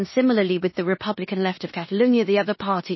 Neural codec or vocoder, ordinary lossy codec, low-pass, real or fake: codec, 16 kHz in and 24 kHz out, 0.9 kbps, LongCat-Audio-Codec, four codebook decoder; MP3, 24 kbps; 7.2 kHz; fake